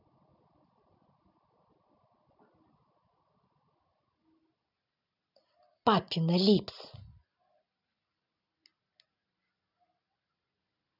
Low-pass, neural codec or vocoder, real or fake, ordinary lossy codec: 5.4 kHz; none; real; none